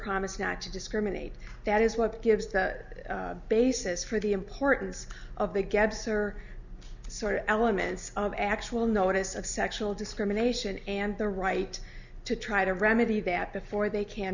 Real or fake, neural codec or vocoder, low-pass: real; none; 7.2 kHz